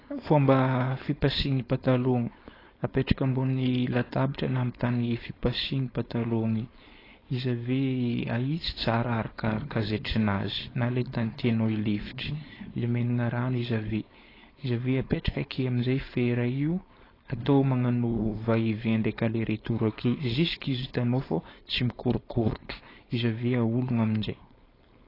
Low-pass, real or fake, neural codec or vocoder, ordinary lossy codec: 5.4 kHz; fake; codec, 16 kHz, 4.8 kbps, FACodec; AAC, 24 kbps